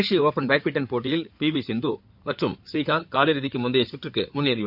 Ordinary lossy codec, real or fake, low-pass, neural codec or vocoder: none; fake; 5.4 kHz; codec, 16 kHz in and 24 kHz out, 2.2 kbps, FireRedTTS-2 codec